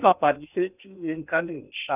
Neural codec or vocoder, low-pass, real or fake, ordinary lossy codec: codec, 16 kHz in and 24 kHz out, 0.6 kbps, FocalCodec, streaming, 2048 codes; 3.6 kHz; fake; none